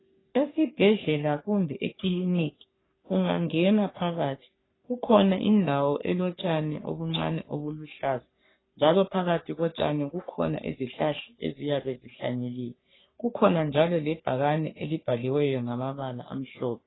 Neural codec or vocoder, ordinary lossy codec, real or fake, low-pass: codec, 44.1 kHz, 3.4 kbps, Pupu-Codec; AAC, 16 kbps; fake; 7.2 kHz